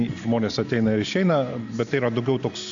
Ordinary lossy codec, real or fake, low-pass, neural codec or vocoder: AAC, 64 kbps; real; 7.2 kHz; none